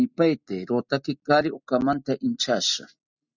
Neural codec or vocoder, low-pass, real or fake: none; 7.2 kHz; real